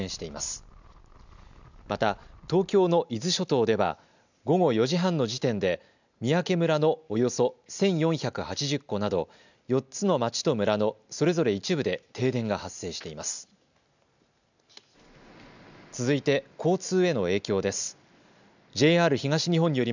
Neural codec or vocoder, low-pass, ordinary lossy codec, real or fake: none; 7.2 kHz; none; real